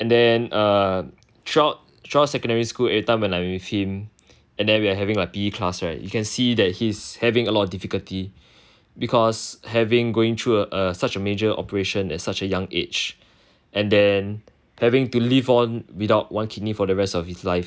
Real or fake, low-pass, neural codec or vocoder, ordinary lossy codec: real; none; none; none